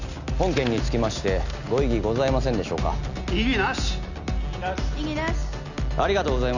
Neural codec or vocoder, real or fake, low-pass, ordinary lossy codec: none; real; 7.2 kHz; none